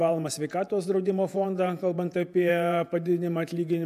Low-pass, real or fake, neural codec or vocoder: 14.4 kHz; fake; vocoder, 48 kHz, 128 mel bands, Vocos